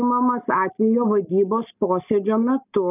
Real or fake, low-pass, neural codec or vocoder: real; 3.6 kHz; none